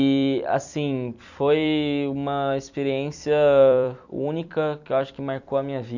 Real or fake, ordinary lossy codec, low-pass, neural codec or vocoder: fake; none; 7.2 kHz; vocoder, 44.1 kHz, 128 mel bands every 256 samples, BigVGAN v2